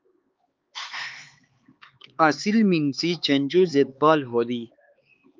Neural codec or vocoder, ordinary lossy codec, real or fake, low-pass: codec, 16 kHz, 4 kbps, X-Codec, HuBERT features, trained on LibriSpeech; Opus, 24 kbps; fake; 7.2 kHz